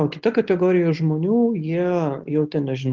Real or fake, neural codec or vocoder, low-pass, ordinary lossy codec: real; none; 7.2 kHz; Opus, 16 kbps